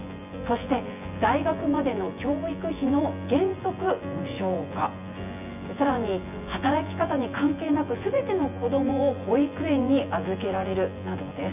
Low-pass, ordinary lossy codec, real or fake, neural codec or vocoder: 3.6 kHz; none; fake; vocoder, 24 kHz, 100 mel bands, Vocos